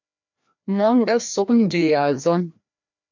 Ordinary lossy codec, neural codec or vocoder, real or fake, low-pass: MP3, 64 kbps; codec, 16 kHz, 1 kbps, FreqCodec, larger model; fake; 7.2 kHz